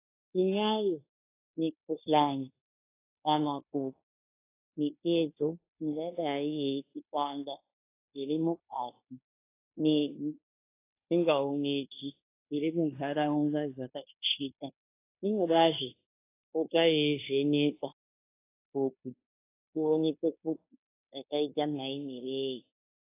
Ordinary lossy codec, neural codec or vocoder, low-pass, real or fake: AAC, 24 kbps; codec, 16 kHz in and 24 kHz out, 0.9 kbps, LongCat-Audio-Codec, fine tuned four codebook decoder; 3.6 kHz; fake